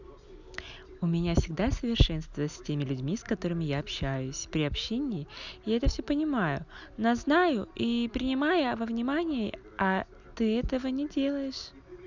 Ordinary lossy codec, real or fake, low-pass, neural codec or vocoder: none; real; 7.2 kHz; none